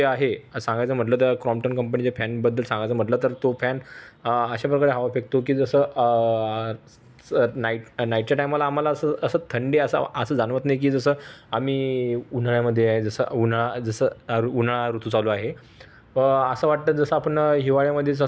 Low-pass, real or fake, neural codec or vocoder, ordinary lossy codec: none; real; none; none